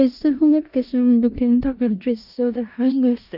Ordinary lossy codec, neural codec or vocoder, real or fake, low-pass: AAC, 48 kbps; codec, 16 kHz in and 24 kHz out, 0.4 kbps, LongCat-Audio-Codec, four codebook decoder; fake; 5.4 kHz